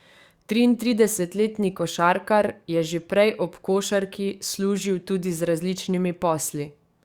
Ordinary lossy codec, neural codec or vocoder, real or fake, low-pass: Opus, 64 kbps; codec, 44.1 kHz, 7.8 kbps, DAC; fake; 19.8 kHz